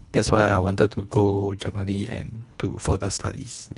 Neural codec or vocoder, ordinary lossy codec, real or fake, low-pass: codec, 24 kHz, 1.5 kbps, HILCodec; none; fake; 10.8 kHz